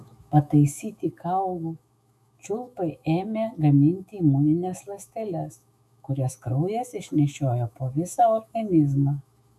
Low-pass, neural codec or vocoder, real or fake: 14.4 kHz; autoencoder, 48 kHz, 128 numbers a frame, DAC-VAE, trained on Japanese speech; fake